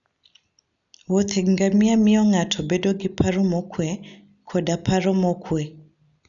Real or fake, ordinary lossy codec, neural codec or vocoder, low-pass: real; none; none; 7.2 kHz